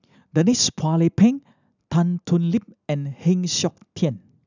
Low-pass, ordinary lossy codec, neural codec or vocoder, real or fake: 7.2 kHz; none; none; real